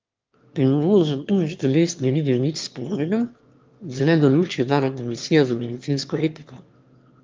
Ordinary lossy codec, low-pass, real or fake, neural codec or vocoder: Opus, 32 kbps; 7.2 kHz; fake; autoencoder, 22.05 kHz, a latent of 192 numbers a frame, VITS, trained on one speaker